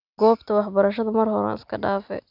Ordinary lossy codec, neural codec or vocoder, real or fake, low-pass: none; none; real; 5.4 kHz